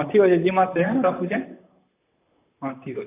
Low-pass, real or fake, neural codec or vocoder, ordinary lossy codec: 3.6 kHz; fake; vocoder, 44.1 kHz, 128 mel bands, Pupu-Vocoder; AAC, 24 kbps